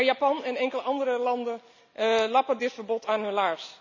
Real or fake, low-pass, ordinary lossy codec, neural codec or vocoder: real; 7.2 kHz; none; none